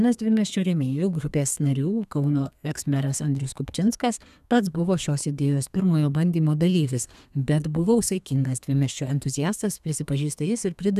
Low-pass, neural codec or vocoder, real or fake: 14.4 kHz; codec, 32 kHz, 1.9 kbps, SNAC; fake